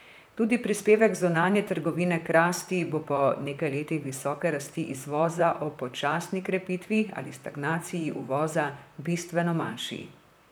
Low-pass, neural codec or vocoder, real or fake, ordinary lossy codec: none; vocoder, 44.1 kHz, 128 mel bands, Pupu-Vocoder; fake; none